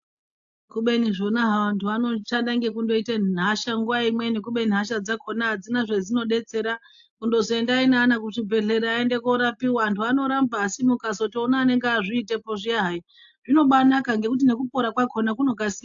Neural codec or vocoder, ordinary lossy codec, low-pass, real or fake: none; AAC, 64 kbps; 7.2 kHz; real